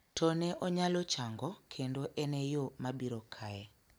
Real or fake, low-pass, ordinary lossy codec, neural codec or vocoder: real; none; none; none